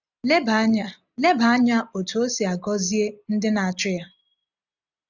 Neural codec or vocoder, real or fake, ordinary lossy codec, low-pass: none; real; none; 7.2 kHz